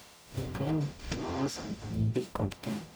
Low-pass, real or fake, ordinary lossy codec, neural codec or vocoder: none; fake; none; codec, 44.1 kHz, 0.9 kbps, DAC